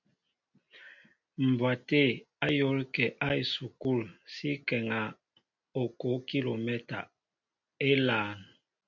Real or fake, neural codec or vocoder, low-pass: real; none; 7.2 kHz